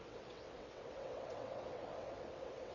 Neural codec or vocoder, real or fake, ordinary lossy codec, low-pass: vocoder, 22.05 kHz, 80 mel bands, WaveNeXt; fake; none; 7.2 kHz